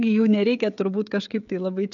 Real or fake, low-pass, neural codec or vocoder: fake; 7.2 kHz; codec, 16 kHz, 16 kbps, FreqCodec, smaller model